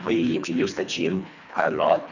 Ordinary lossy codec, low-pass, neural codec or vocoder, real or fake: none; 7.2 kHz; codec, 24 kHz, 1.5 kbps, HILCodec; fake